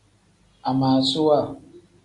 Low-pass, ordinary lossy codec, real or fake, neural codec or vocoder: 10.8 kHz; AAC, 64 kbps; real; none